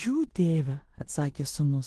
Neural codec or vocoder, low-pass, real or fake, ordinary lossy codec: codec, 16 kHz in and 24 kHz out, 0.9 kbps, LongCat-Audio-Codec, four codebook decoder; 10.8 kHz; fake; Opus, 16 kbps